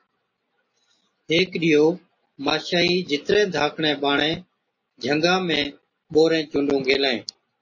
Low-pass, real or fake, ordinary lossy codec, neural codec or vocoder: 7.2 kHz; real; MP3, 32 kbps; none